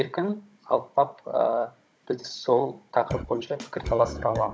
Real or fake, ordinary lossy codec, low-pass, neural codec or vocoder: fake; none; none; codec, 16 kHz, 16 kbps, FunCodec, trained on Chinese and English, 50 frames a second